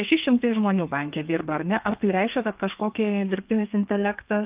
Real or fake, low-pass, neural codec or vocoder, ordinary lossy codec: fake; 3.6 kHz; codec, 32 kHz, 1.9 kbps, SNAC; Opus, 24 kbps